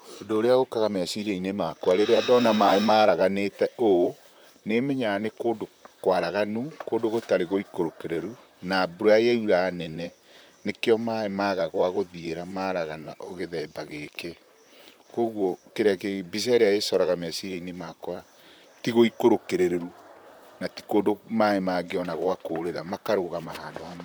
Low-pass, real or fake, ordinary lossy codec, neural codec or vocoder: none; fake; none; vocoder, 44.1 kHz, 128 mel bands, Pupu-Vocoder